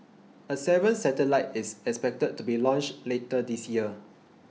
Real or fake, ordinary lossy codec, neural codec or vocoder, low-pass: real; none; none; none